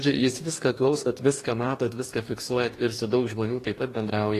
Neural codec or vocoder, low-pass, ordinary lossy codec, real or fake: codec, 44.1 kHz, 2.6 kbps, DAC; 14.4 kHz; AAC, 48 kbps; fake